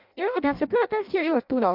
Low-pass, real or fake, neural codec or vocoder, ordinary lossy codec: 5.4 kHz; fake; codec, 16 kHz in and 24 kHz out, 0.6 kbps, FireRedTTS-2 codec; MP3, 48 kbps